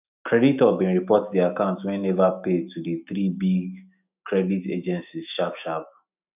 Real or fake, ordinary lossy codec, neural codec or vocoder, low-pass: fake; none; autoencoder, 48 kHz, 128 numbers a frame, DAC-VAE, trained on Japanese speech; 3.6 kHz